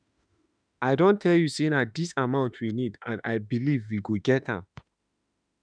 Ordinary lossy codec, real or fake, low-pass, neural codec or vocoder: none; fake; 9.9 kHz; autoencoder, 48 kHz, 32 numbers a frame, DAC-VAE, trained on Japanese speech